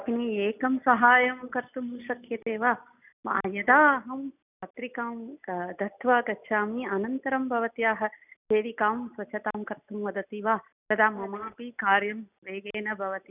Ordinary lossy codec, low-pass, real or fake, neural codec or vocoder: none; 3.6 kHz; real; none